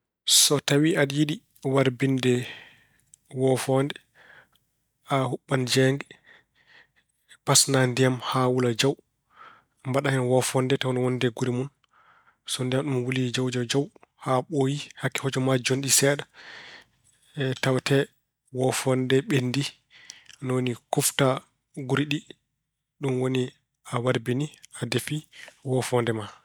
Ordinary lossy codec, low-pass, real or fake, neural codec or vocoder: none; none; real; none